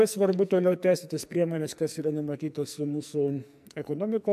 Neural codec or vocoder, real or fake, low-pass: codec, 44.1 kHz, 2.6 kbps, SNAC; fake; 14.4 kHz